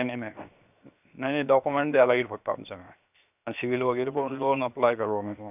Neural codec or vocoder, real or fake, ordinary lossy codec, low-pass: codec, 16 kHz, 0.7 kbps, FocalCodec; fake; none; 3.6 kHz